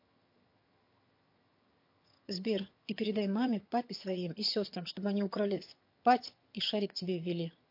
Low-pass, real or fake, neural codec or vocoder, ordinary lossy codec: 5.4 kHz; fake; vocoder, 22.05 kHz, 80 mel bands, HiFi-GAN; MP3, 32 kbps